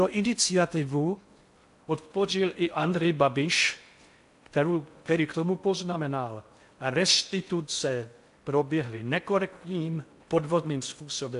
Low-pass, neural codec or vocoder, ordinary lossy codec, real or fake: 10.8 kHz; codec, 16 kHz in and 24 kHz out, 0.6 kbps, FocalCodec, streaming, 4096 codes; AAC, 64 kbps; fake